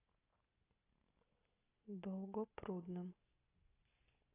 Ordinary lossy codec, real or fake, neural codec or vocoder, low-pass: AAC, 24 kbps; real; none; 3.6 kHz